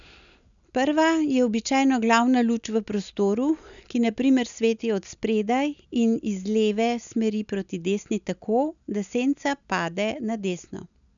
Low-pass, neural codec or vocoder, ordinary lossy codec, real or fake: 7.2 kHz; none; none; real